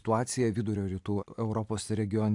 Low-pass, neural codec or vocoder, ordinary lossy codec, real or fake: 10.8 kHz; none; AAC, 64 kbps; real